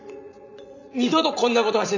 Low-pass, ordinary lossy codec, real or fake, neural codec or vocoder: 7.2 kHz; none; fake; vocoder, 44.1 kHz, 80 mel bands, Vocos